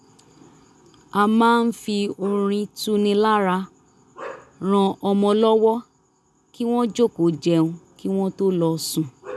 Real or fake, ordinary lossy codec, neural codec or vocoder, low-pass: real; none; none; none